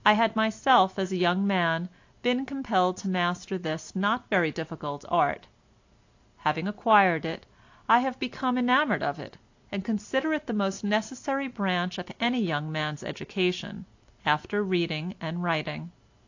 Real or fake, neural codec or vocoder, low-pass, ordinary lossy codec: real; none; 7.2 kHz; AAC, 48 kbps